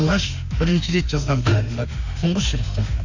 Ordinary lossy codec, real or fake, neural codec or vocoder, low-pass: none; fake; autoencoder, 48 kHz, 32 numbers a frame, DAC-VAE, trained on Japanese speech; 7.2 kHz